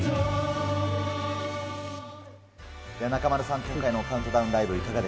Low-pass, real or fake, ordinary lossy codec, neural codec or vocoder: none; real; none; none